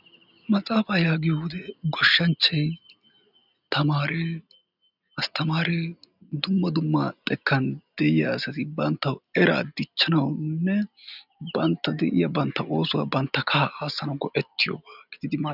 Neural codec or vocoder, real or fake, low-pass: none; real; 5.4 kHz